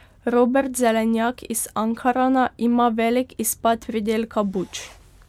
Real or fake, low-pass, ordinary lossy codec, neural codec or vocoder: real; 19.8 kHz; MP3, 96 kbps; none